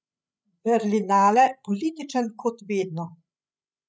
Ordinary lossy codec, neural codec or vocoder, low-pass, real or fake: none; codec, 16 kHz, 16 kbps, FreqCodec, larger model; none; fake